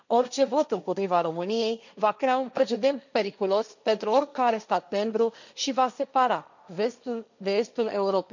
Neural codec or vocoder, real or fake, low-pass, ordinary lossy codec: codec, 16 kHz, 1.1 kbps, Voila-Tokenizer; fake; 7.2 kHz; none